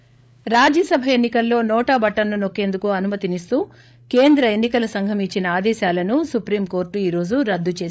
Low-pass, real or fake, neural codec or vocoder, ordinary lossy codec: none; fake; codec, 16 kHz, 8 kbps, FreqCodec, larger model; none